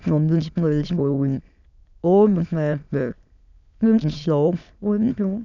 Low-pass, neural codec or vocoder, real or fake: 7.2 kHz; autoencoder, 22.05 kHz, a latent of 192 numbers a frame, VITS, trained on many speakers; fake